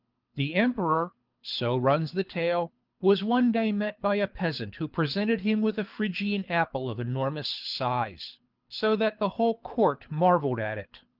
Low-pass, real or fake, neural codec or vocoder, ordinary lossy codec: 5.4 kHz; fake; codec, 24 kHz, 6 kbps, HILCodec; Opus, 32 kbps